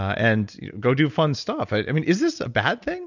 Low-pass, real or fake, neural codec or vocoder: 7.2 kHz; real; none